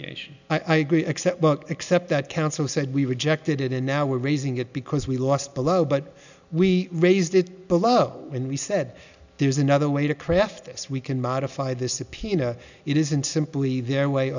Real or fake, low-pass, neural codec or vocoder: real; 7.2 kHz; none